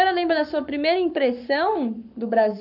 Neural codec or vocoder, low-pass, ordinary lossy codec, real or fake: codec, 44.1 kHz, 7.8 kbps, Pupu-Codec; 5.4 kHz; none; fake